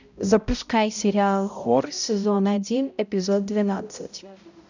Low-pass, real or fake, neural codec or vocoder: 7.2 kHz; fake; codec, 16 kHz, 0.5 kbps, X-Codec, HuBERT features, trained on balanced general audio